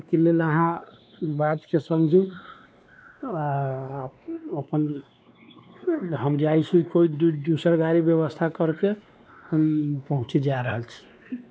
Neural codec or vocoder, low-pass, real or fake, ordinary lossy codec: codec, 16 kHz, 2 kbps, X-Codec, WavLM features, trained on Multilingual LibriSpeech; none; fake; none